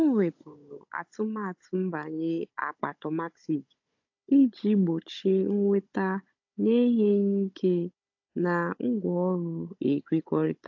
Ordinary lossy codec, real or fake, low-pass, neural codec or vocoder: AAC, 48 kbps; fake; 7.2 kHz; codec, 16 kHz, 16 kbps, FunCodec, trained on Chinese and English, 50 frames a second